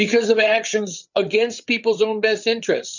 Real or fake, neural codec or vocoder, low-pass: fake; codec, 16 kHz, 8 kbps, FreqCodec, larger model; 7.2 kHz